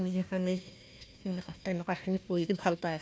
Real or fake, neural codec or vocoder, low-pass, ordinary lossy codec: fake; codec, 16 kHz, 1 kbps, FunCodec, trained on Chinese and English, 50 frames a second; none; none